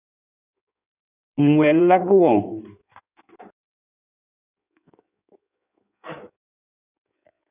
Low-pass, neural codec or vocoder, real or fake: 3.6 kHz; codec, 16 kHz in and 24 kHz out, 1.1 kbps, FireRedTTS-2 codec; fake